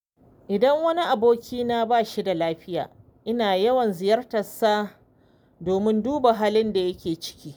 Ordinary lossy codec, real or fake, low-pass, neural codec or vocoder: none; real; none; none